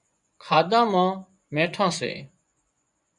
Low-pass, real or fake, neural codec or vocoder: 10.8 kHz; fake; vocoder, 24 kHz, 100 mel bands, Vocos